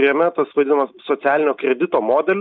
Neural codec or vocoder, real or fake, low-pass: none; real; 7.2 kHz